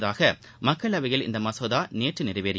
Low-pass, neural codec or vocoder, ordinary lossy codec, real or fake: none; none; none; real